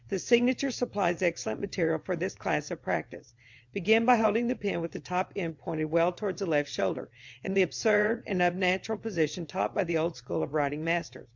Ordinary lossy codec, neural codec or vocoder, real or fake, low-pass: MP3, 64 kbps; vocoder, 22.05 kHz, 80 mel bands, WaveNeXt; fake; 7.2 kHz